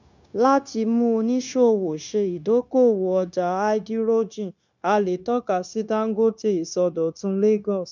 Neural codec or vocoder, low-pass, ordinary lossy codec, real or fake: codec, 16 kHz, 0.9 kbps, LongCat-Audio-Codec; 7.2 kHz; none; fake